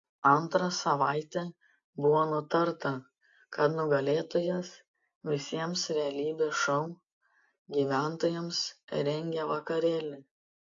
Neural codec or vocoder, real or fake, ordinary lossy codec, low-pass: none; real; AAC, 48 kbps; 7.2 kHz